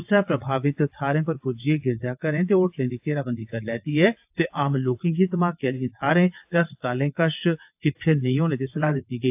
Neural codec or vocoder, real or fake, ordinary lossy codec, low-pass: vocoder, 22.05 kHz, 80 mel bands, WaveNeXt; fake; AAC, 32 kbps; 3.6 kHz